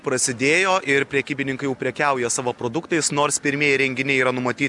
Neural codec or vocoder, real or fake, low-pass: none; real; 10.8 kHz